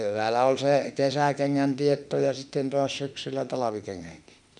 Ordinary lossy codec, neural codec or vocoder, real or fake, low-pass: none; autoencoder, 48 kHz, 32 numbers a frame, DAC-VAE, trained on Japanese speech; fake; 10.8 kHz